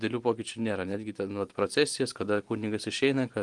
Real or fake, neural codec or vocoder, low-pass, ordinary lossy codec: real; none; 10.8 kHz; Opus, 24 kbps